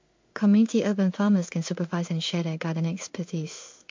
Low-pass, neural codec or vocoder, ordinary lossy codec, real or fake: 7.2 kHz; codec, 16 kHz in and 24 kHz out, 1 kbps, XY-Tokenizer; MP3, 48 kbps; fake